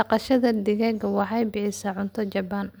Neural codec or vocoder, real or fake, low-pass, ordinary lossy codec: none; real; none; none